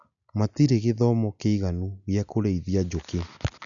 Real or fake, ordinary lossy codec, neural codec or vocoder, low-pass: real; none; none; 7.2 kHz